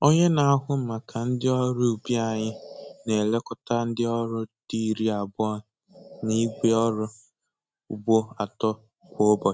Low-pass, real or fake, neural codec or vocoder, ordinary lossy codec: none; real; none; none